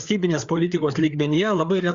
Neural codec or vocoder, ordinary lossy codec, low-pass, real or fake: codec, 16 kHz, 4 kbps, FreqCodec, larger model; Opus, 64 kbps; 7.2 kHz; fake